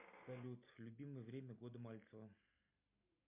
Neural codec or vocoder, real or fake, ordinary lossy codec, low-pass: none; real; MP3, 32 kbps; 3.6 kHz